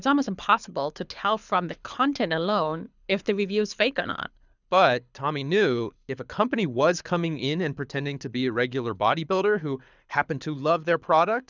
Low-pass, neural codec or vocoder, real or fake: 7.2 kHz; codec, 24 kHz, 6 kbps, HILCodec; fake